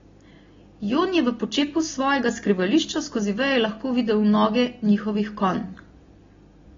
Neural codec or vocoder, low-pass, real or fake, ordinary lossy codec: none; 7.2 kHz; real; AAC, 24 kbps